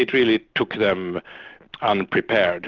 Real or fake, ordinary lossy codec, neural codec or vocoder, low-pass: real; Opus, 32 kbps; none; 7.2 kHz